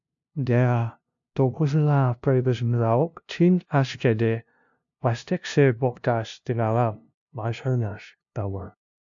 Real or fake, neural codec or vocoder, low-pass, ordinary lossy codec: fake; codec, 16 kHz, 0.5 kbps, FunCodec, trained on LibriTTS, 25 frames a second; 7.2 kHz; AAC, 64 kbps